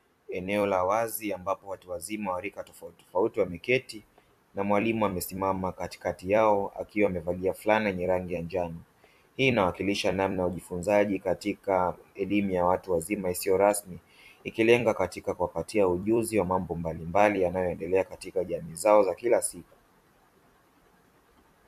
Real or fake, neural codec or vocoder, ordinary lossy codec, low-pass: fake; vocoder, 44.1 kHz, 128 mel bands every 256 samples, BigVGAN v2; AAC, 96 kbps; 14.4 kHz